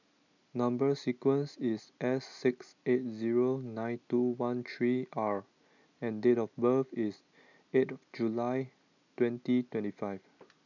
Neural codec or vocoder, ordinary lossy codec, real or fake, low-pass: none; none; real; 7.2 kHz